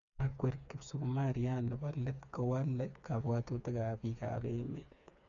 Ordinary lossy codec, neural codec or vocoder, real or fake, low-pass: none; codec, 16 kHz, 4 kbps, FreqCodec, smaller model; fake; 7.2 kHz